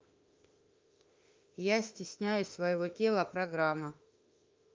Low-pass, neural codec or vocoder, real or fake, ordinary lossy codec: 7.2 kHz; autoencoder, 48 kHz, 32 numbers a frame, DAC-VAE, trained on Japanese speech; fake; Opus, 24 kbps